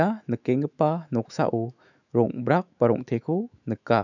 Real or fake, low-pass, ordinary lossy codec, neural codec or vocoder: fake; 7.2 kHz; none; autoencoder, 48 kHz, 128 numbers a frame, DAC-VAE, trained on Japanese speech